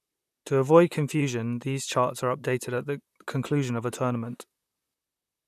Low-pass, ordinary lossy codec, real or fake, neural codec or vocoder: 14.4 kHz; none; fake; vocoder, 44.1 kHz, 128 mel bands, Pupu-Vocoder